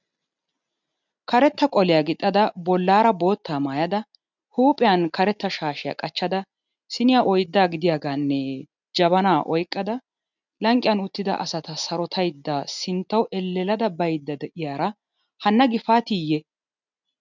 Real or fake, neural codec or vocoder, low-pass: real; none; 7.2 kHz